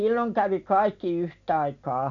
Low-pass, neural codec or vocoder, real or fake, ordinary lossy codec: 7.2 kHz; none; real; none